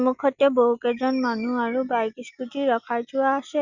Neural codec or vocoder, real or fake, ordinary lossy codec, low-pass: none; real; Opus, 64 kbps; 7.2 kHz